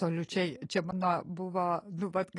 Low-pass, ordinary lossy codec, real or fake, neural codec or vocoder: 10.8 kHz; AAC, 32 kbps; real; none